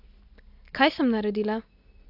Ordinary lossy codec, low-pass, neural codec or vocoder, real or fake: AAC, 48 kbps; 5.4 kHz; none; real